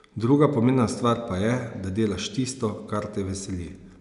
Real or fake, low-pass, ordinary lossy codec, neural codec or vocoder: real; 10.8 kHz; none; none